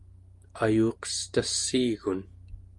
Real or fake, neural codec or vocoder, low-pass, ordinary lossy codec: real; none; 10.8 kHz; Opus, 32 kbps